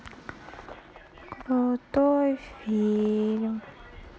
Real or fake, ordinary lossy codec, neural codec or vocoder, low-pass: real; none; none; none